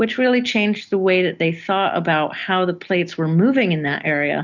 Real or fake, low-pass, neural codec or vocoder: real; 7.2 kHz; none